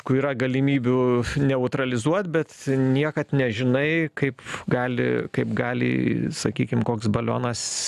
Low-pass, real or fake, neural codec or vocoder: 14.4 kHz; real; none